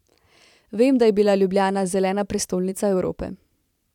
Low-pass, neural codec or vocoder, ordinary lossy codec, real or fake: 19.8 kHz; vocoder, 44.1 kHz, 128 mel bands every 512 samples, BigVGAN v2; none; fake